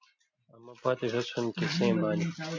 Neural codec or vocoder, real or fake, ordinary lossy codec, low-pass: none; real; MP3, 48 kbps; 7.2 kHz